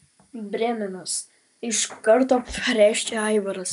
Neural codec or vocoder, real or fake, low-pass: none; real; 14.4 kHz